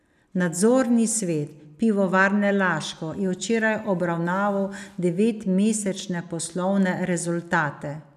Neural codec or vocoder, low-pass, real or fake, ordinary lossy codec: none; 14.4 kHz; real; none